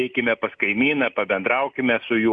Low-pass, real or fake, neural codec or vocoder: 9.9 kHz; real; none